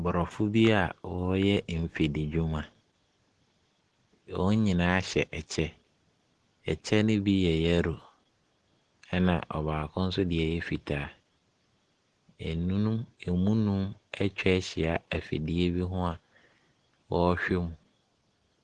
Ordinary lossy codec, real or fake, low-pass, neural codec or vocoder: Opus, 16 kbps; real; 10.8 kHz; none